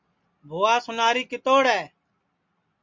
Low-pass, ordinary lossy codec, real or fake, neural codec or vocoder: 7.2 kHz; MP3, 64 kbps; real; none